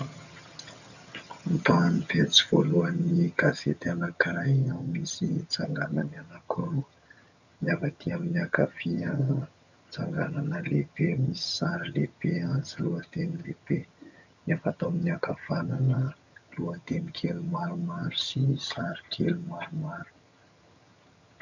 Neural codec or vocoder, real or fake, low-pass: vocoder, 22.05 kHz, 80 mel bands, HiFi-GAN; fake; 7.2 kHz